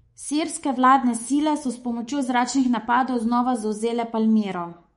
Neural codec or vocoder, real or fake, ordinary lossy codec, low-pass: codec, 24 kHz, 3.1 kbps, DualCodec; fake; MP3, 48 kbps; 10.8 kHz